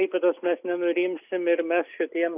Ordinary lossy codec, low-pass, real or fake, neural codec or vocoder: AAC, 32 kbps; 3.6 kHz; real; none